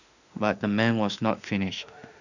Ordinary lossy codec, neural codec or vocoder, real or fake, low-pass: none; autoencoder, 48 kHz, 32 numbers a frame, DAC-VAE, trained on Japanese speech; fake; 7.2 kHz